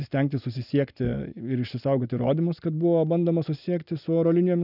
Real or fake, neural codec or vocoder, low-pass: real; none; 5.4 kHz